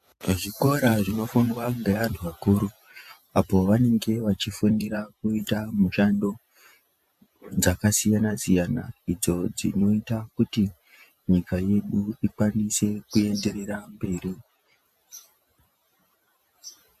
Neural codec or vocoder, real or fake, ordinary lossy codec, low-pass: vocoder, 48 kHz, 128 mel bands, Vocos; fake; AAC, 96 kbps; 14.4 kHz